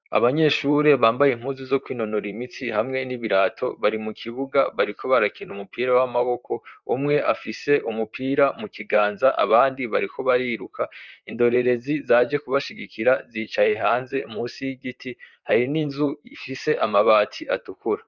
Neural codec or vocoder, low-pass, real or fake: vocoder, 44.1 kHz, 128 mel bands, Pupu-Vocoder; 7.2 kHz; fake